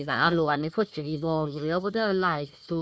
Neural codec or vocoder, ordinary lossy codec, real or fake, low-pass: codec, 16 kHz, 1 kbps, FunCodec, trained on Chinese and English, 50 frames a second; none; fake; none